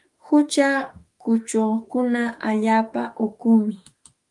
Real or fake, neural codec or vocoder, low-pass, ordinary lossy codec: fake; autoencoder, 48 kHz, 32 numbers a frame, DAC-VAE, trained on Japanese speech; 10.8 kHz; Opus, 32 kbps